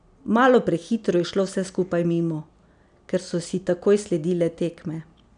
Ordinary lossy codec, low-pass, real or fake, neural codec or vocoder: none; 9.9 kHz; real; none